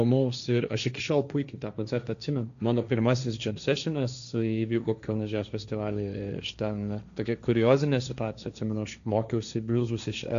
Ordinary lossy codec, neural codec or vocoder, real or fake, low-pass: MP3, 96 kbps; codec, 16 kHz, 1.1 kbps, Voila-Tokenizer; fake; 7.2 kHz